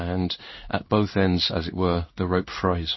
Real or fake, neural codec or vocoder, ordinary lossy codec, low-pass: fake; codec, 16 kHz in and 24 kHz out, 1 kbps, XY-Tokenizer; MP3, 24 kbps; 7.2 kHz